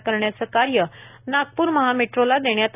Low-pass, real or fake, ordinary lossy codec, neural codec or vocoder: 3.6 kHz; real; none; none